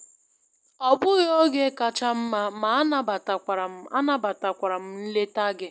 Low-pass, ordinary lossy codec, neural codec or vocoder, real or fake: none; none; none; real